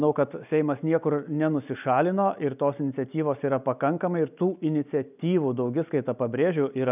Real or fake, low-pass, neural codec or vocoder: real; 3.6 kHz; none